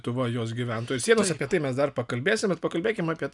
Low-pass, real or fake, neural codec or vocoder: 10.8 kHz; real; none